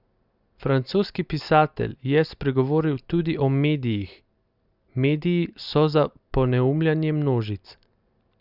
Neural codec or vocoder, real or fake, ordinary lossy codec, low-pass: none; real; Opus, 64 kbps; 5.4 kHz